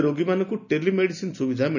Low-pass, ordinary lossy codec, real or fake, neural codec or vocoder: 7.2 kHz; none; real; none